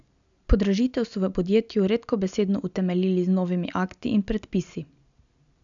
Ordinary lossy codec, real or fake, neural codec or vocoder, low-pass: none; real; none; 7.2 kHz